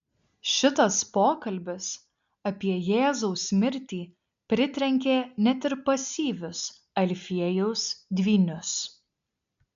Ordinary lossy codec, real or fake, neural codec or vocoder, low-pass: MP3, 64 kbps; real; none; 7.2 kHz